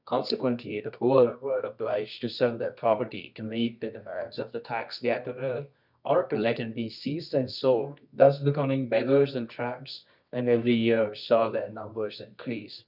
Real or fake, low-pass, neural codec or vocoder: fake; 5.4 kHz; codec, 24 kHz, 0.9 kbps, WavTokenizer, medium music audio release